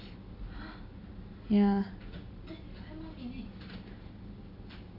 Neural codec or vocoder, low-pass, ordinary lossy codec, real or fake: none; 5.4 kHz; none; real